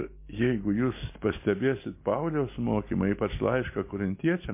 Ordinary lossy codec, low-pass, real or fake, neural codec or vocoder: MP3, 24 kbps; 3.6 kHz; real; none